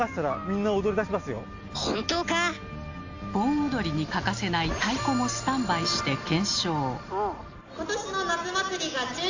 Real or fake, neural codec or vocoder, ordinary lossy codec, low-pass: real; none; AAC, 48 kbps; 7.2 kHz